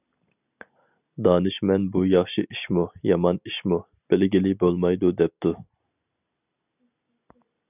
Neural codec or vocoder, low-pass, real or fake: none; 3.6 kHz; real